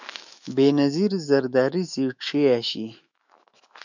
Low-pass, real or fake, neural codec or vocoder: 7.2 kHz; fake; autoencoder, 48 kHz, 128 numbers a frame, DAC-VAE, trained on Japanese speech